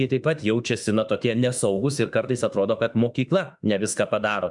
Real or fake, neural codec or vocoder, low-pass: fake; autoencoder, 48 kHz, 32 numbers a frame, DAC-VAE, trained on Japanese speech; 10.8 kHz